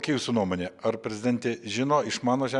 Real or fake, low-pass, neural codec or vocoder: real; 10.8 kHz; none